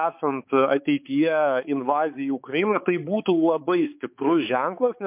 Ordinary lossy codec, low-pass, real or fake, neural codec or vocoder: MP3, 32 kbps; 3.6 kHz; fake; codec, 16 kHz, 4 kbps, X-Codec, HuBERT features, trained on balanced general audio